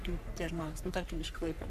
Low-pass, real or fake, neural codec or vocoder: 14.4 kHz; fake; codec, 44.1 kHz, 3.4 kbps, Pupu-Codec